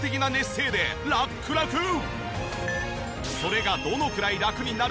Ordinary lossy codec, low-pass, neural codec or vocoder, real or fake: none; none; none; real